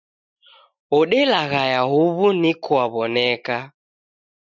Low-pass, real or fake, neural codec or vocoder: 7.2 kHz; real; none